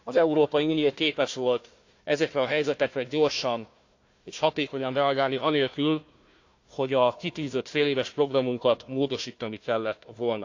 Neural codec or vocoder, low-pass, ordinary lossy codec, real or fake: codec, 16 kHz, 1 kbps, FunCodec, trained on Chinese and English, 50 frames a second; 7.2 kHz; AAC, 48 kbps; fake